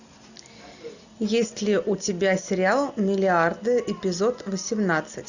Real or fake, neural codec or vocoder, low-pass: real; none; 7.2 kHz